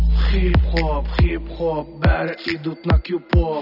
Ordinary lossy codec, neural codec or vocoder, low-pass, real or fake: none; none; 5.4 kHz; real